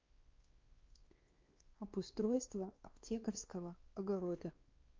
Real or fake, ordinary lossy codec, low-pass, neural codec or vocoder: fake; Opus, 24 kbps; 7.2 kHz; codec, 16 kHz, 2 kbps, X-Codec, WavLM features, trained on Multilingual LibriSpeech